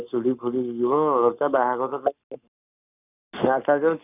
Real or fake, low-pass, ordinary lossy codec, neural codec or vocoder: fake; 3.6 kHz; none; codec, 44.1 kHz, 7.8 kbps, Pupu-Codec